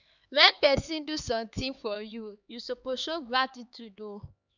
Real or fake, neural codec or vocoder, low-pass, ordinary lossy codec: fake; codec, 16 kHz, 4 kbps, X-Codec, HuBERT features, trained on LibriSpeech; 7.2 kHz; none